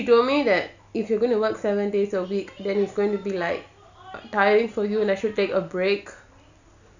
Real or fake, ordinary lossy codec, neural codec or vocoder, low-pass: real; none; none; 7.2 kHz